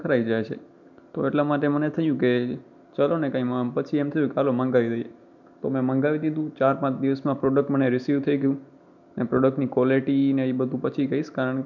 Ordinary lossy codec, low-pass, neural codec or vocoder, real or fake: none; 7.2 kHz; none; real